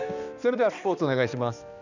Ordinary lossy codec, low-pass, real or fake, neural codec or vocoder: none; 7.2 kHz; fake; codec, 16 kHz, 2 kbps, X-Codec, HuBERT features, trained on balanced general audio